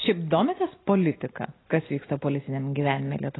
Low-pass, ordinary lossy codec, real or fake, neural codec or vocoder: 7.2 kHz; AAC, 16 kbps; real; none